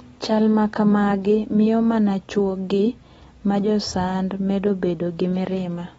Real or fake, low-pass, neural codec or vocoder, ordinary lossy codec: real; 19.8 kHz; none; AAC, 24 kbps